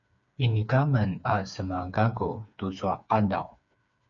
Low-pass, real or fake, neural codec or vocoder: 7.2 kHz; fake; codec, 16 kHz, 4 kbps, FreqCodec, smaller model